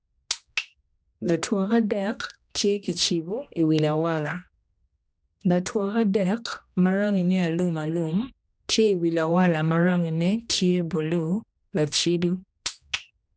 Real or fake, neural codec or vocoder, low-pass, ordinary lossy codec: fake; codec, 16 kHz, 1 kbps, X-Codec, HuBERT features, trained on general audio; none; none